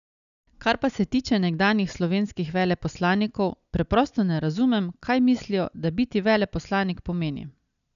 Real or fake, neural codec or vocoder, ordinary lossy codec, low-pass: real; none; none; 7.2 kHz